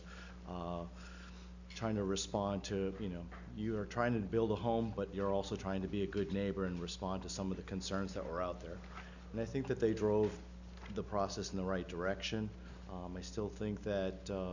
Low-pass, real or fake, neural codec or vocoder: 7.2 kHz; real; none